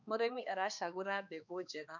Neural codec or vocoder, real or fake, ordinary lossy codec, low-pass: codec, 16 kHz, 2 kbps, X-Codec, HuBERT features, trained on balanced general audio; fake; none; 7.2 kHz